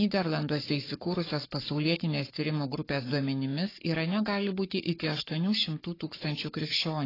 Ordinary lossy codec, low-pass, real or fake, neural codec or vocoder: AAC, 24 kbps; 5.4 kHz; fake; codec, 44.1 kHz, 7.8 kbps, Pupu-Codec